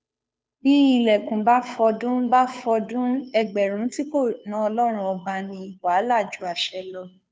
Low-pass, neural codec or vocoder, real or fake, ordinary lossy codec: none; codec, 16 kHz, 2 kbps, FunCodec, trained on Chinese and English, 25 frames a second; fake; none